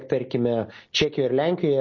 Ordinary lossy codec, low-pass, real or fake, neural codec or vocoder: MP3, 32 kbps; 7.2 kHz; real; none